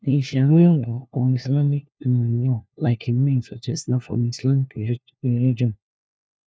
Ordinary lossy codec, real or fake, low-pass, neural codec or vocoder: none; fake; none; codec, 16 kHz, 1 kbps, FunCodec, trained on LibriTTS, 50 frames a second